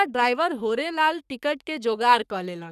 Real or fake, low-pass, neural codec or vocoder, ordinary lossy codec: fake; 14.4 kHz; codec, 44.1 kHz, 3.4 kbps, Pupu-Codec; none